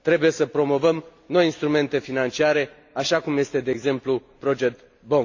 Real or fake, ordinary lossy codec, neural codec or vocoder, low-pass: real; AAC, 48 kbps; none; 7.2 kHz